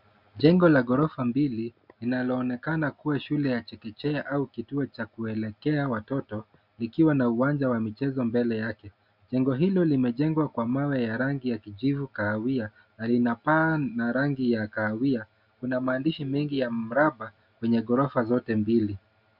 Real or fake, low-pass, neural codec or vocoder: real; 5.4 kHz; none